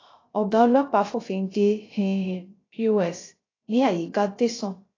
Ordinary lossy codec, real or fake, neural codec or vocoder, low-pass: AAC, 32 kbps; fake; codec, 16 kHz, 0.3 kbps, FocalCodec; 7.2 kHz